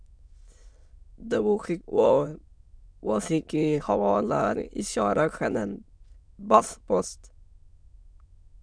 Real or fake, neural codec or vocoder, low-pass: fake; autoencoder, 22.05 kHz, a latent of 192 numbers a frame, VITS, trained on many speakers; 9.9 kHz